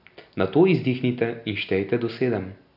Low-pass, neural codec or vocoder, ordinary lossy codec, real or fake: 5.4 kHz; none; none; real